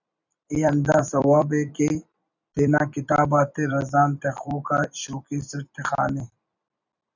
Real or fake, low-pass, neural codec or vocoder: fake; 7.2 kHz; vocoder, 44.1 kHz, 128 mel bands every 256 samples, BigVGAN v2